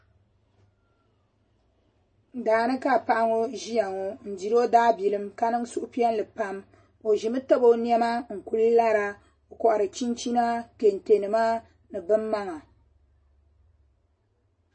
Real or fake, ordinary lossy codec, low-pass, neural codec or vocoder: real; MP3, 32 kbps; 9.9 kHz; none